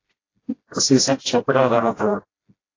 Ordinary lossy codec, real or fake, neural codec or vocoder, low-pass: AAC, 32 kbps; fake; codec, 16 kHz, 0.5 kbps, FreqCodec, smaller model; 7.2 kHz